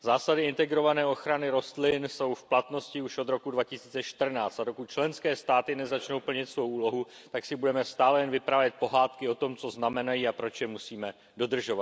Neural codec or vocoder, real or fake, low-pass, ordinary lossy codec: none; real; none; none